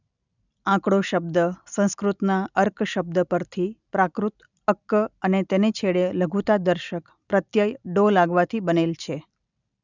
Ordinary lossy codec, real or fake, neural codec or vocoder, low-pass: none; real; none; 7.2 kHz